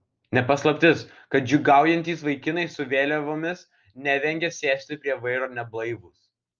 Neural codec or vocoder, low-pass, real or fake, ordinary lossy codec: none; 7.2 kHz; real; Opus, 24 kbps